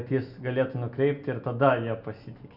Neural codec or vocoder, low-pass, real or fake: none; 5.4 kHz; real